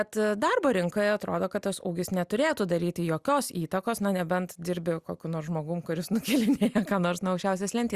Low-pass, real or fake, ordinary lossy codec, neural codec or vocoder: 14.4 kHz; real; Opus, 64 kbps; none